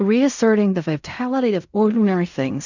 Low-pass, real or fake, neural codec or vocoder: 7.2 kHz; fake; codec, 16 kHz in and 24 kHz out, 0.4 kbps, LongCat-Audio-Codec, fine tuned four codebook decoder